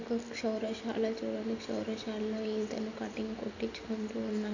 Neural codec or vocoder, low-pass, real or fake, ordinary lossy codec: none; 7.2 kHz; real; none